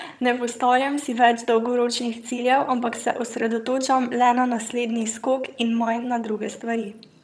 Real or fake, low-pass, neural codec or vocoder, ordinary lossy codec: fake; none; vocoder, 22.05 kHz, 80 mel bands, HiFi-GAN; none